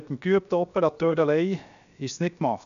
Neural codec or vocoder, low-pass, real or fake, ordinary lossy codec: codec, 16 kHz, 0.7 kbps, FocalCodec; 7.2 kHz; fake; none